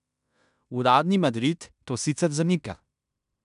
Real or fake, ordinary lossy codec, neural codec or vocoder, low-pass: fake; none; codec, 16 kHz in and 24 kHz out, 0.9 kbps, LongCat-Audio-Codec, fine tuned four codebook decoder; 10.8 kHz